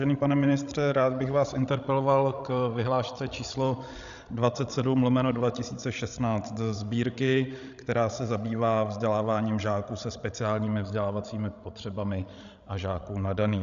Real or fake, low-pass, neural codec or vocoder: fake; 7.2 kHz; codec, 16 kHz, 16 kbps, FreqCodec, larger model